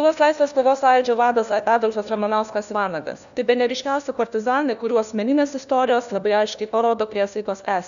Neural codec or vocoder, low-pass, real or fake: codec, 16 kHz, 1 kbps, FunCodec, trained on LibriTTS, 50 frames a second; 7.2 kHz; fake